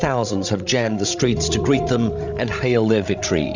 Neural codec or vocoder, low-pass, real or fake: codec, 16 kHz, 16 kbps, FreqCodec, larger model; 7.2 kHz; fake